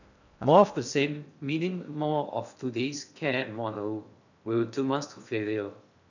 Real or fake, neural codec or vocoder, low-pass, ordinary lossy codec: fake; codec, 16 kHz in and 24 kHz out, 0.6 kbps, FocalCodec, streaming, 2048 codes; 7.2 kHz; none